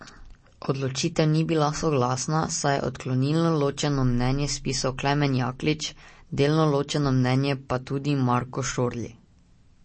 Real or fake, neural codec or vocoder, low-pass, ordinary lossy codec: real; none; 9.9 kHz; MP3, 32 kbps